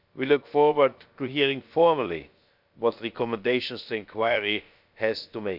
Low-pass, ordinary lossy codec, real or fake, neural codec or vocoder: 5.4 kHz; none; fake; codec, 16 kHz, about 1 kbps, DyCAST, with the encoder's durations